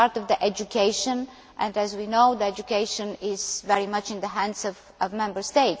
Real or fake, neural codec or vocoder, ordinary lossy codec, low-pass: real; none; none; none